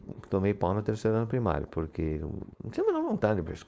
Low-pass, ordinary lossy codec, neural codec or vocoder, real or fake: none; none; codec, 16 kHz, 4.8 kbps, FACodec; fake